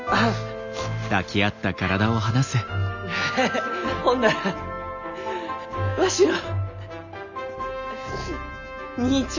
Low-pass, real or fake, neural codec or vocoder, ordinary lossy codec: 7.2 kHz; real; none; none